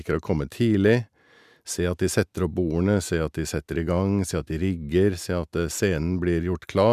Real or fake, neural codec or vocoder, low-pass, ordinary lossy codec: real; none; 14.4 kHz; none